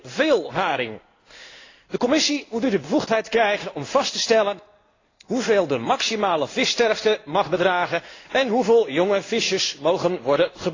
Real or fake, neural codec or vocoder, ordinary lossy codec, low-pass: fake; codec, 16 kHz in and 24 kHz out, 1 kbps, XY-Tokenizer; AAC, 32 kbps; 7.2 kHz